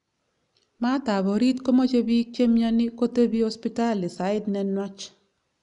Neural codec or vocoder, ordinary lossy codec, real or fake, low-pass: none; none; real; 10.8 kHz